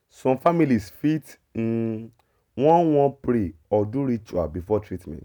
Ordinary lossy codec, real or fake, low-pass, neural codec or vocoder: none; real; none; none